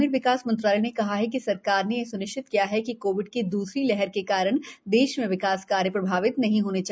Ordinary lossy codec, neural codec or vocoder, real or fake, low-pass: none; none; real; none